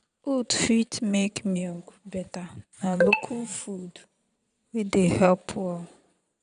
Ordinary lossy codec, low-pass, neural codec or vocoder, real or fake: none; 9.9 kHz; vocoder, 22.05 kHz, 80 mel bands, Vocos; fake